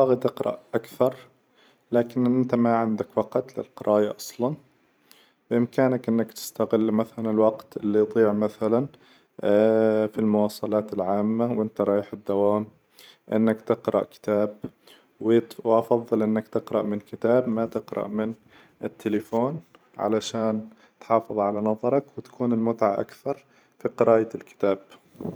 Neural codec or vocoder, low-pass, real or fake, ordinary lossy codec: vocoder, 44.1 kHz, 128 mel bands every 512 samples, BigVGAN v2; none; fake; none